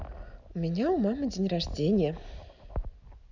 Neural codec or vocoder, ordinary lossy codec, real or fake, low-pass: none; none; real; 7.2 kHz